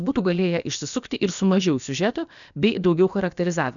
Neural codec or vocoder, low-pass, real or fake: codec, 16 kHz, about 1 kbps, DyCAST, with the encoder's durations; 7.2 kHz; fake